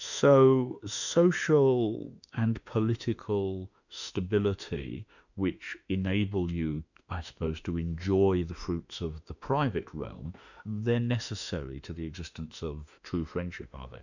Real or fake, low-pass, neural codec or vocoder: fake; 7.2 kHz; autoencoder, 48 kHz, 32 numbers a frame, DAC-VAE, trained on Japanese speech